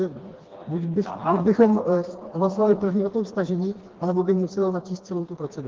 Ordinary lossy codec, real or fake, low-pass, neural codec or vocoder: Opus, 16 kbps; fake; 7.2 kHz; codec, 16 kHz, 2 kbps, FreqCodec, smaller model